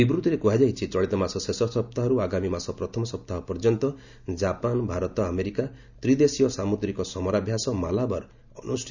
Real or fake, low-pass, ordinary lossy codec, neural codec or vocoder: real; 7.2 kHz; none; none